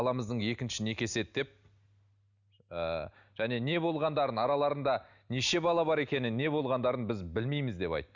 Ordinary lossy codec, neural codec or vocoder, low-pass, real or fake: none; none; 7.2 kHz; real